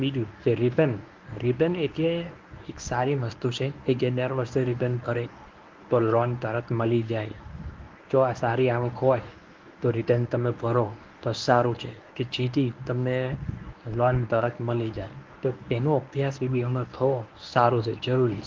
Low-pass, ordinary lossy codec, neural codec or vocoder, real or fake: 7.2 kHz; Opus, 32 kbps; codec, 24 kHz, 0.9 kbps, WavTokenizer, medium speech release version 1; fake